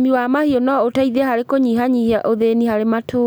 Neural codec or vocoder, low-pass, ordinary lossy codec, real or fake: none; none; none; real